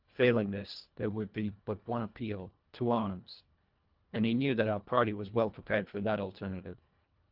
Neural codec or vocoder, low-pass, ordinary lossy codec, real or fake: codec, 24 kHz, 1.5 kbps, HILCodec; 5.4 kHz; Opus, 32 kbps; fake